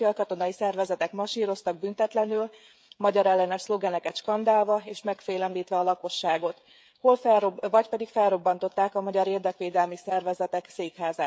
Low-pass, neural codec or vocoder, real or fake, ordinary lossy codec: none; codec, 16 kHz, 16 kbps, FreqCodec, smaller model; fake; none